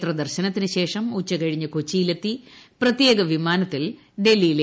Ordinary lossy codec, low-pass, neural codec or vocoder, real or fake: none; none; none; real